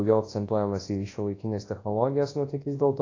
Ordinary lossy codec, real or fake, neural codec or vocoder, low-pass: AAC, 32 kbps; fake; codec, 24 kHz, 0.9 kbps, WavTokenizer, large speech release; 7.2 kHz